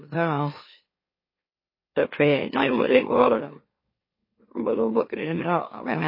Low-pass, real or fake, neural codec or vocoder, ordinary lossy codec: 5.4 kHz; fake; autoencoder, 44.1 kHz, a latent of 192 numbers a frame, MeloTTS; MP3, 24 kbps